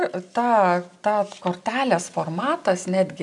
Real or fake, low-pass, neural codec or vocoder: real; 10.8 kHz; none